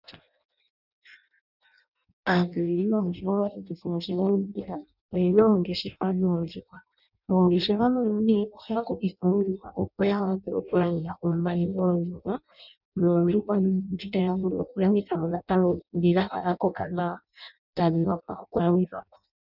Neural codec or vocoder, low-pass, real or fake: codec, 16 kHz in and 24 kHz out, 0.6 kbps, FireRedTTS-2 codec; 5.4 kHz; fake